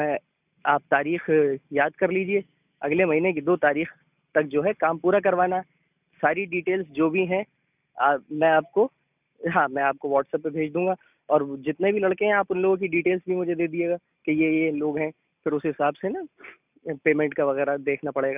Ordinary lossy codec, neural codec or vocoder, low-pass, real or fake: none; none; 3.6 kHz; real